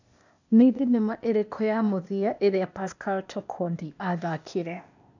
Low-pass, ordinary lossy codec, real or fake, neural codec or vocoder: 7.2 kHz; none; fake; codec, 16 kHz, 0.8 kbps, ZipCodec